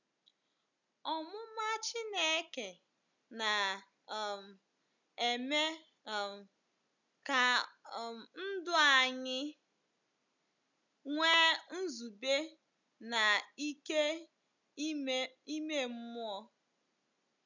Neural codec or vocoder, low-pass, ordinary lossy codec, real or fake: none; 7.2 kHz; none; real